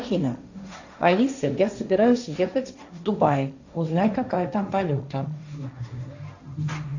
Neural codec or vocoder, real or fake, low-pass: codec, 16 kHz, 1.1 kbps, Voila-Tokenizer; fake; 7.2 kHz